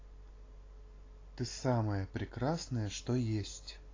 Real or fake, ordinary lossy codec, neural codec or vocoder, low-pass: real; AAC, 32 kbps; none; 7.2 kHz